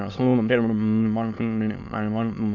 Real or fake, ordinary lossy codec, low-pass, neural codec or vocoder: fake; none; 7.2 kHz; autoencoder, 22.05 kHz, a latent of 192 numbers a frame, VITS, trained on many speakers